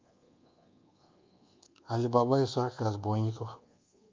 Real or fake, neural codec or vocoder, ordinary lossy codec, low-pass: fake; codec, 24 kHz, 1.2 kbps, DualCodec; Opus, 24 kbps; 7.2 kHz